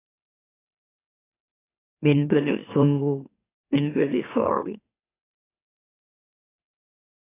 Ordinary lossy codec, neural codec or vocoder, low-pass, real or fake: AAC, 16 kbps; autoencoder, 44.1 kHz, a latent of 192 numbers a frame, MeloTTS; 3.6 kHz; fake